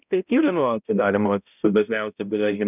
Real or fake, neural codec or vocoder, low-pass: fake; codec, 16 kHz, 0.5 kbps, X-Codec, HuBERT features, trained on balanced general audio; 3.6 kHz